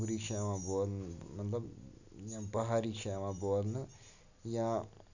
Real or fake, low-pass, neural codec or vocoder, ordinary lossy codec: real; 7.2 kHz; none; none